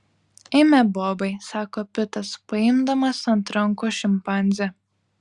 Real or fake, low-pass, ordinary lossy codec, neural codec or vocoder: real; 10.8 kHz; Opus, 64 kbps; none